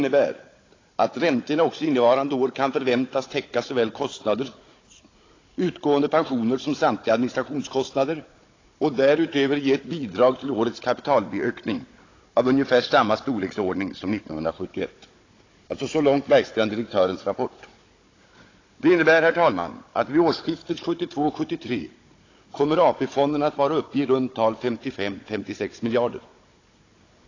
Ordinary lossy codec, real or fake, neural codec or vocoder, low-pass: AAC, 32 kbps; fake; codec, 16 kHz, 16 kbps, FunCodec, trained on Chinese and English, 50 frames a second; 7.2 kHz